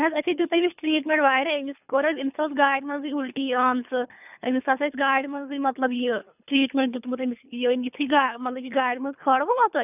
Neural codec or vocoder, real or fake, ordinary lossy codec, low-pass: codec, 24 kHz, 3 kbps, HILCodec; fake; none; 3.6 kHz